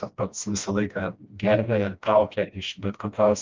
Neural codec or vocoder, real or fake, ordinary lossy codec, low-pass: codec, 16 kHz, 1 kbps, FreqCodec, smaller model; fake; Opus, 32 kbps; 7.2 kHz